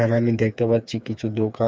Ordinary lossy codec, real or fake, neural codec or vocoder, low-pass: none; fake; codec, 16 kHz, 4 kbps, FreqCodec, smaller model; none